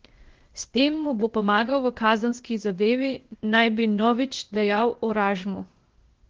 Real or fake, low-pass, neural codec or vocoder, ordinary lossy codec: fake; 7.2 kHz; codec, 16 kHz, 0.8 kbps, ZipCodec; Opus, 16 kbps